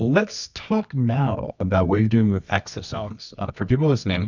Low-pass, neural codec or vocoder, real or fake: 7.2 kHz; codec, 24 kHz, 0.9 kbps, WavTokenizer, medium music audio release; fake